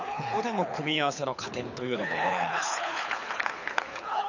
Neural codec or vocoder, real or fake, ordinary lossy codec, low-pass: codec, 24 kHz, 6 kbps, HILCodec; fake; none; 7.2 kHz